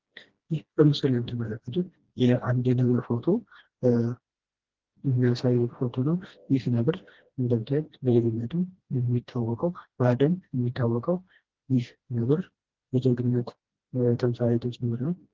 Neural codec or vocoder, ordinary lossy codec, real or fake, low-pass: codec, 16 kHz, 1 kbps, FreqCodec, smaller model; Opus, 16 kbps; fake; 7.2 kHz